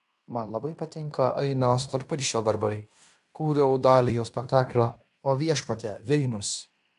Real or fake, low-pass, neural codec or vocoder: fake; 10.8 kHz; codec, 16 kHz in and 24 kHz out, 0.9 kbps, LongCat-Audio-Codec, fine tuned four codebook decoder